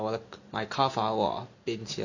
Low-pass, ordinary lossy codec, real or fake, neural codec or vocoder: 7.2 kHz; none; real; none